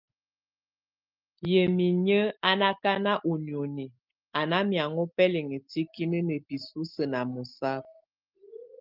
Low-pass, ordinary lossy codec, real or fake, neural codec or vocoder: 5.4 kHz; Opus, 32 kbps; real; none